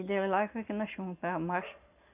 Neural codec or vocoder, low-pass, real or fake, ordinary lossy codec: none; 3.6 kHz; real; none